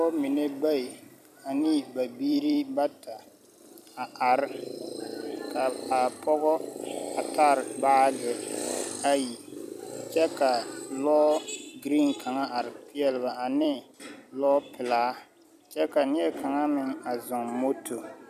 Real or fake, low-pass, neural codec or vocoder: fake; 14.4 kHz; vocoder, 44.1 kHz, 128 mel bands every 256 samples, BigVGAN v2